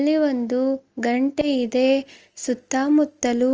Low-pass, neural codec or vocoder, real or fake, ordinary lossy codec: 7.2 kHz; none; real; Opus, 24 kbps